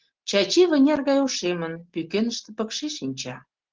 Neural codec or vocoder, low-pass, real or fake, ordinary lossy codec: none; 7.2 kHz; real; Opus, 16 kbps